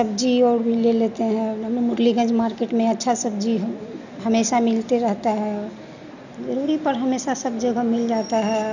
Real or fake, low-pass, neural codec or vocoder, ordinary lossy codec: real; 7.2 kHz; none; none